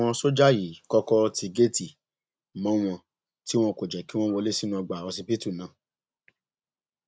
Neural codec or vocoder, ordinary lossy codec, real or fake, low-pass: none; none; real; none